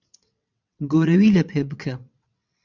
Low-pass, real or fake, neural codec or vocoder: 7.2 kHz; fake; vocoder, 22.05 kHz, 80 mel bands, WaveNeXt